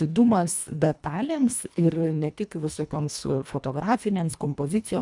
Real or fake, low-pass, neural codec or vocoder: fake; 10.8 kHz; codec, 24 kHz, 1.5 kbps, HILCodec